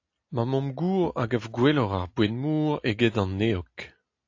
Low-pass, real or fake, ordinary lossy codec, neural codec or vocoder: 7.2 kHz; real; AAC, 48 kbps; none